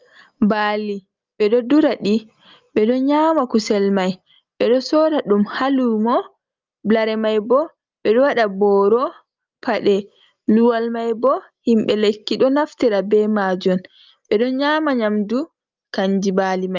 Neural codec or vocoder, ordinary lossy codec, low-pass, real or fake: none; Opus, 24 kbps; 7.2 kHz; real